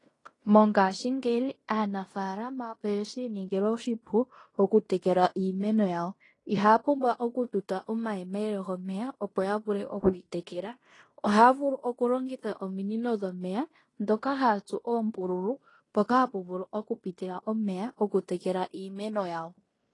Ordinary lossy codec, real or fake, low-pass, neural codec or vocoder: AAC, 32 kbps; fake; 10.8 kHz; codec, 16 kHz in and 24 kHz out, 0.9 kbps, LongCat-Audio-Codec, fine tuned four codebook decoder